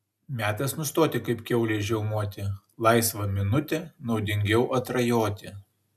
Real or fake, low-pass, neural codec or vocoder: real; 14.4 kHz; none